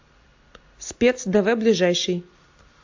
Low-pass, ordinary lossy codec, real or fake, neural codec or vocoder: 7.2 kHz; AAC, 48 kbps; real; none